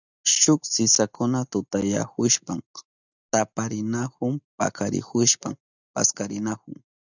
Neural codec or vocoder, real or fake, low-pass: none; real; 7.2 kHz